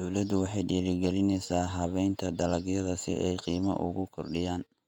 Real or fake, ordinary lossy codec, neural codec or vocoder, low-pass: fake; none; vocoder, 48 kHz, 128 mel bands, Vocos; 19.8 kHz